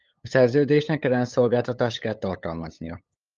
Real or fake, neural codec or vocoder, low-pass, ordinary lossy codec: fake; codec, 16 kHz, 8 kbps, FunCodec, trained on LibriTTS, 25 frames a second; 7.2 kHz; Opus, 24 kbps